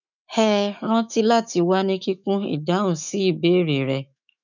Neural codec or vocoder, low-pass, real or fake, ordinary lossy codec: autoencoder, 48 kHz, 128 numbers a frame, DAC-VAE, trained on Japanese speech; 7.2 kHz; fake; none